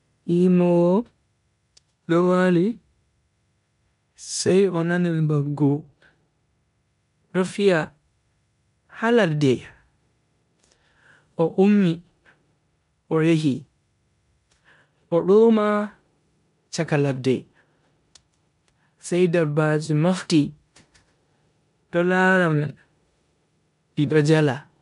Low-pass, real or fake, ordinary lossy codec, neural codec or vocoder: 10.8 kHz; fake; none; codec, 16 kHz in and 24 kHz out, 0.9 kbps, LongCat-Audio-Codec, four codebook decoder